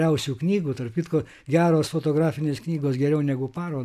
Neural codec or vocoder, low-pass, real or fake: none; 14.4 kHz; real